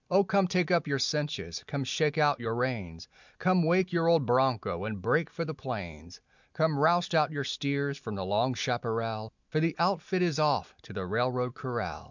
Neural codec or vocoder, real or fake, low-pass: none; real; 7.2 kHz